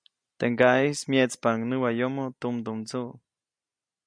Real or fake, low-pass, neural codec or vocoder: real; 9.9 kHz; none